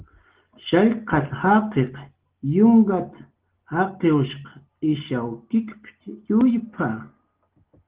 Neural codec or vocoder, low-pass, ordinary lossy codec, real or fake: none; 3.6 kHz; Opus, 16 kbps; real